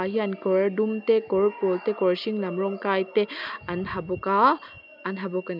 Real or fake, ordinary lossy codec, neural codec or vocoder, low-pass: real; none; none; 5.4 kHz